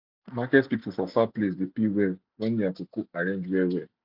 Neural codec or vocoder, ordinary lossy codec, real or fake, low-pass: none; none; real; 5.4 kHz